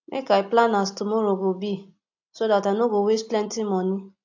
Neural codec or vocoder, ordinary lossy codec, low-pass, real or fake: none; AAC, 48 kbps; 7.2 kHz; real